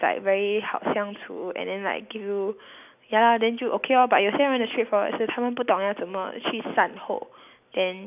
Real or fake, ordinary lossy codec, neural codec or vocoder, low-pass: real; none; none; 3.6 kHz